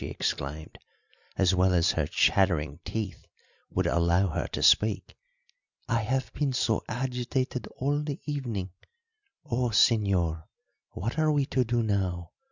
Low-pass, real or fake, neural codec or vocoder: 7.2 kHz; real; none